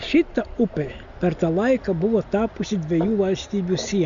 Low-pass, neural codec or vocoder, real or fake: 7.2 kHz; none; real